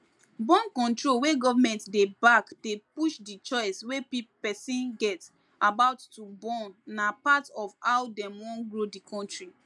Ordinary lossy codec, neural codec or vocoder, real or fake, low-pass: none; none; real; none